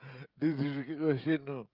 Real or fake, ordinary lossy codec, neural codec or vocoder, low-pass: fake; Opus, 24 kbps; codec, 16 kHz, 16 kbps, FreqCodec, smaller model; 5.4 kHz